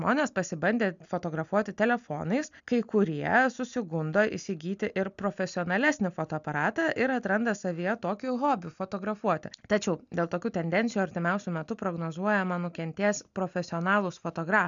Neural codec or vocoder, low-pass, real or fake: none; 7.2 kHz; real